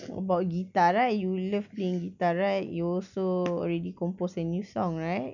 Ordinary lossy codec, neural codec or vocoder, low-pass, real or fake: none; none; 7.2 kHz; real